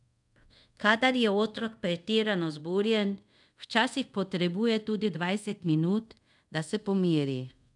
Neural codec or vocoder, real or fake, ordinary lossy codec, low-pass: codec, 24 kHz, 0.5 kbps, DualCodec; fake; none; 10.8 kHz